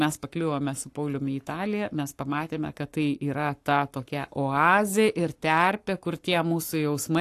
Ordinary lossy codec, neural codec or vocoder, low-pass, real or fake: AAC, 64 kbps; codec, 44.1 kHz, 7.8 kbps, Pupu-Codec; 14.4 kHz; fake